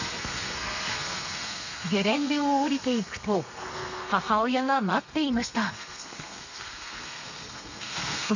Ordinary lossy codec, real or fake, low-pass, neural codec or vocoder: none; fake; 7.2 kHz; codec, 32 kHz, 1.9 kbps, SNAC